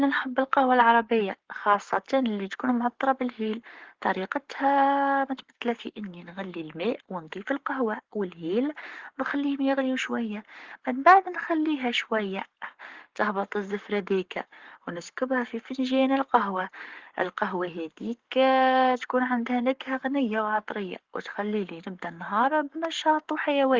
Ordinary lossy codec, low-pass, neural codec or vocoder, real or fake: Opus, 16 kbps; 7.2 kHz; codec, 44.1 kHz, 7.8 kbps, Pupu-Codec; fake